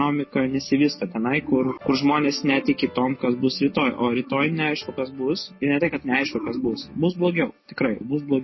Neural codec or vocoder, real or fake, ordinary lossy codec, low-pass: none; real; MP3, 24 kbps; 7.2 kHz